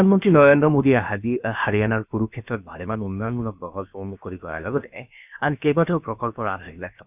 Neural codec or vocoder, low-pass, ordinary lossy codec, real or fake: codec, 16 kHz, about 1 kbps, DyCAST, with the encoder's durations; 3.6 kHz; none; fake